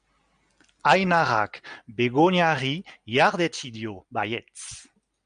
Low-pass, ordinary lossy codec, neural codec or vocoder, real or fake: 9.9 kHz; MP3, 96 kbps; none; real